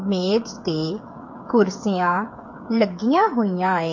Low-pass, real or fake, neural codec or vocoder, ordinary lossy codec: 7.2 kHz; fake; codec, 16 kHz, 8 kbps, FreqCodec, smaller model; MP3, 48 kbps